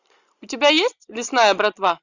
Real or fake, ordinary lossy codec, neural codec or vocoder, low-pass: real; Opus, 64 kbps; none; 7.2 kHz